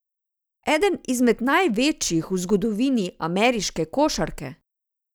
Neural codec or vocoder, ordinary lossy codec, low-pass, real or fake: none; none; none; real